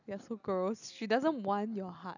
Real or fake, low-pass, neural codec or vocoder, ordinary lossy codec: real; 7.2 kHz; none; none